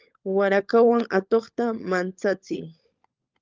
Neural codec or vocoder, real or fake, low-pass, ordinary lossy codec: codec, 16 kHz, 16 kbps, FunCodec, trained on LibriTTS, 50 frames a second; fake; 7.2 kHz; Opus, 24 kbps